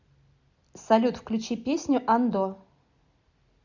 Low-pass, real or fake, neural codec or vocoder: 7.2 kHz; real; none